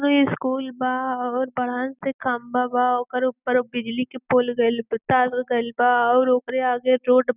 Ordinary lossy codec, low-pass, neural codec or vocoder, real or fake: none; 3.6 kHz; none; real